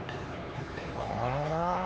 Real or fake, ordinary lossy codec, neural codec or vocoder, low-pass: fake; none; codec, 16 kHz, 4 kbps, X-Codec, HuBERT features, trained on LibriSpeech; none